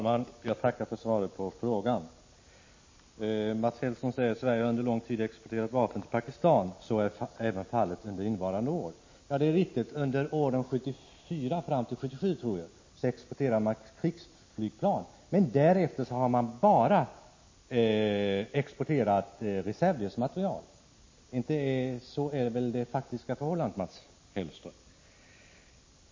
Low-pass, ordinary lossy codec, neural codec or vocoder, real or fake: 7.2 kHz; MP3, 32 kbps; none; real